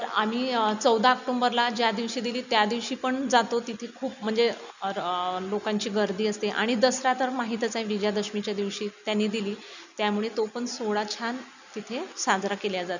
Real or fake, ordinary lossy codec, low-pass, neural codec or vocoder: real; none; 7.2 kHz; none